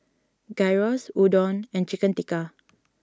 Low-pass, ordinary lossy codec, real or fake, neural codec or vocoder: none; none; real; none